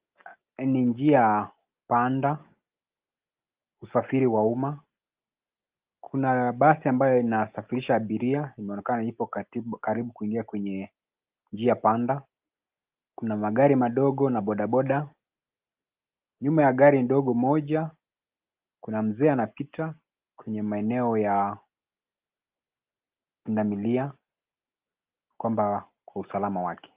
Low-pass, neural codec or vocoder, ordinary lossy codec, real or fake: 3.6 kHz; none; Opus, 32 kbps; real